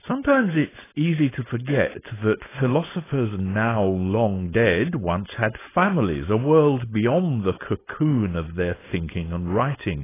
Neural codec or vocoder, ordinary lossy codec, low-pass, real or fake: codec, 16 kHz, 4.8 kbps, FACodec; AAC, 16 kbps; 3.6 kHz; fake